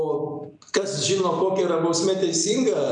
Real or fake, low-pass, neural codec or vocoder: real; 9.9 kHz; none